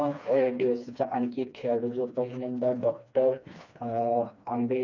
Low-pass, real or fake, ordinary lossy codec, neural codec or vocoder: 7.2 kHz; fake; none; codec, 16 kHz, 2 kbps, FreqCodec, smaller model